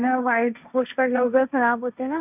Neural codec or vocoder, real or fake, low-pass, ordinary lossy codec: codec, 16 kHz, 1.1 kbps, Voila-Tokenizer; fake; 3.6 kHz; none